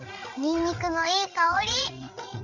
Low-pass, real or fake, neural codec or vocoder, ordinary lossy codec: 7.2 kHz; fake; codec, 16 kHz, 8 kbps, FreqCodec, larger model; none